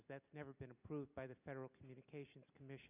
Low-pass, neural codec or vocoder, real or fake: 3.6 kHz; none; real